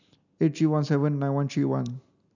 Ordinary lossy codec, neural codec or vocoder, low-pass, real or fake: none; none; 7.2 kHz; real